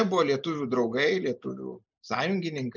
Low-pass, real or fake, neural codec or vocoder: 7.2 kHz; real; none